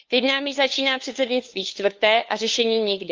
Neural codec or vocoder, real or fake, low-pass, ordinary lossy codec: codec, 16 kHz, 2 kbps, FunCodec, trained on LibriTTS, 25 frames a second; fake; 7.2 kHz; Opus, 24 kbps